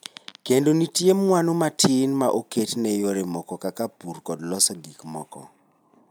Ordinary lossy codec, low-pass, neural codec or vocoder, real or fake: none; none; none; real